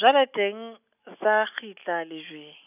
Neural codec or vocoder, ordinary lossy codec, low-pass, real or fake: none; none; 3.6 kHz; real